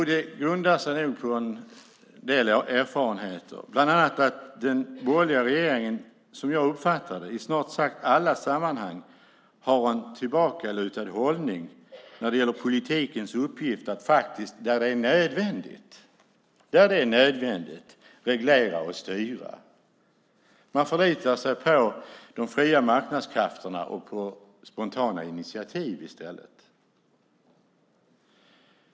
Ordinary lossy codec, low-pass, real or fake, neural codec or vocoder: none; none; real; none